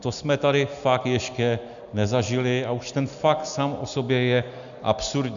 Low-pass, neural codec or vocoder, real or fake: 7.2 kHz; none; real